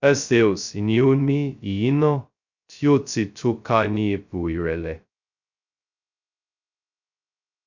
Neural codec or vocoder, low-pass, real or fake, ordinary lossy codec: codec, 16 kHz, 0.2 kbps, FocalCodec; 7.2 kHz; fake; none